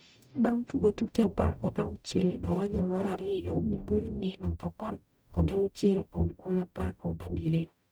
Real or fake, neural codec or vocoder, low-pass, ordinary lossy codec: fake; codec, 44.1 kHz, 0.9 kbps, DAC; none; none